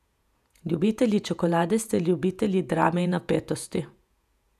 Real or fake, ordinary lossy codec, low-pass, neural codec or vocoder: fake; none; 14.4 kHz; vocoder, 48 kHz, 128 mel bands, Vocos